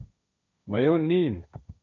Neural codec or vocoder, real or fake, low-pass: codec, 16 kHz, 1.1 kbps, Voila-Tokenizer; fake; 7.2 kHz